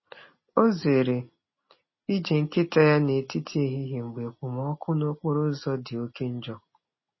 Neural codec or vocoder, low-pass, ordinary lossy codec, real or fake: none; 7.2 kHz; MP3, 24 kbps; real